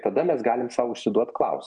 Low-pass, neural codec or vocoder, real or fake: 9.9 kHz; none; real